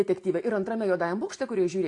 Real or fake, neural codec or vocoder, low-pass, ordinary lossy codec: real; none; 10.8 kHz; AAC, 48 kbps